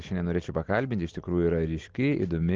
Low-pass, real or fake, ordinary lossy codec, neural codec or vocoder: 7.2 kHz; real; Opus, 16 kbps; none